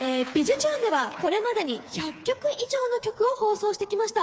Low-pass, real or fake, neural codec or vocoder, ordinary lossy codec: none; fake; codec, 16 kHz, 4 kbps, FreqCodec, smaller model; none